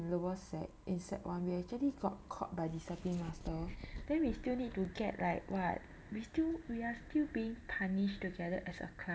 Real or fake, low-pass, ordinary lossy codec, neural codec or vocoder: real; none; none; none